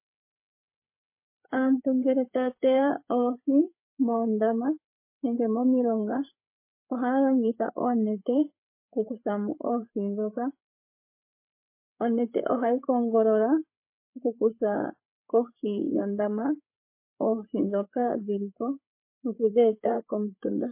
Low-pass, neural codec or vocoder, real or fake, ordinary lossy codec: 3.6 kHz; codec, 16 kHz, 8 kbps, FreqCodec, larger model; fake; MP3, 24 kbps